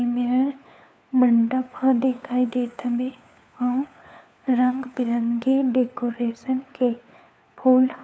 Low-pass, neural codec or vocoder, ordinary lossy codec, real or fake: none; codec, 16 kHz, 4 kbps, FunCodec, trained on LibriTTS, 50 frames a second; none; fake